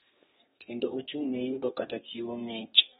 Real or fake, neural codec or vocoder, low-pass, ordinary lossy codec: fake; codec, 32 kHz, 1.9 kbps, SNAC; 14.4 kHz; AAC, 16 kbps